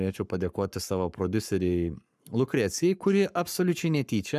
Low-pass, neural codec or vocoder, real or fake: 14.4 kHz; codec, 44.1 kHz, 7.8 kbps, Pupu-Codec; fake